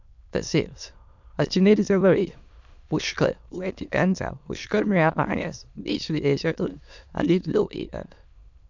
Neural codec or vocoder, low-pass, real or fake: autoencoder, 22.05 kHz, a latent of 192 numbers a frame, VITS, trained on many speakers; 7.2 kHz; fake